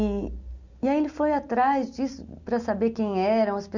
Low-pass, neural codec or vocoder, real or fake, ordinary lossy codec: 7.2 kHz; none; real; none